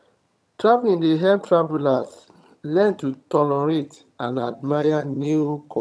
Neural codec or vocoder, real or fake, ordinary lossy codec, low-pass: vocoder, 22.05 kHz, 80 mel bands, HiFi-GAN; fake; none; none